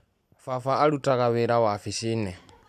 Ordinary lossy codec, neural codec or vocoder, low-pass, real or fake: none; none; 14.4 kHz; real